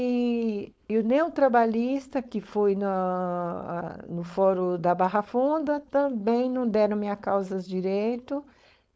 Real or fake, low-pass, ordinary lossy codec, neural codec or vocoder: fake; none; none; codec, 16 kHz, 4.8 kbps, FACodec